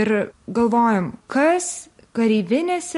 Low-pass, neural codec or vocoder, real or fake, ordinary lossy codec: 10.8 kHz; none; real; MP3, 48 kbps